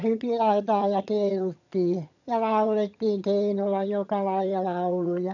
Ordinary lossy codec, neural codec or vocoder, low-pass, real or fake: none; vocoder, 22.05 kHz, 80 mel bands, HiFi-GAN; 7.2 kHz; fake